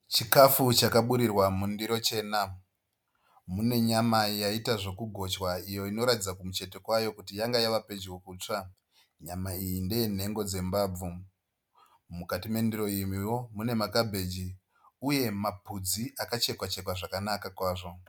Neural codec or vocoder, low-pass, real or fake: none; 19.8 kHz; real